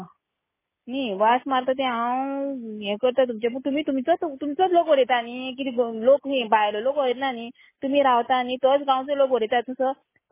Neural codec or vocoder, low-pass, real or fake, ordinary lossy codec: none; 3.6 kHz; real; MP3, 16 kbps